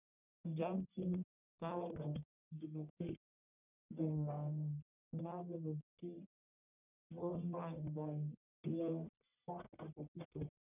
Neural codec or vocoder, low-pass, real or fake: codec, 44.1 kHz, 1.7 kbps, Pupu-Codec; 3.6 kHz; fake